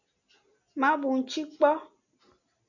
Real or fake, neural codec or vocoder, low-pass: real; none; 7.2 kHz